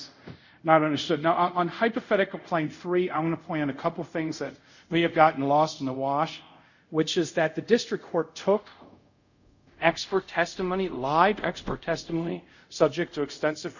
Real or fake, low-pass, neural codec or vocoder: fake; 7.2 kHz; codec, 24 kHz, 0.5 kbps, DualCodec